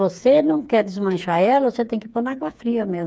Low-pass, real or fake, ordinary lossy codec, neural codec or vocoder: none; fake; none; codec, 16 kHz, 4 kbps, FreqCodec, smaller model